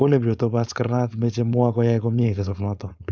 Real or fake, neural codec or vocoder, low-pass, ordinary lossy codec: fake; codec, 16 kHz, 4.8 kbps, FACodec; none; none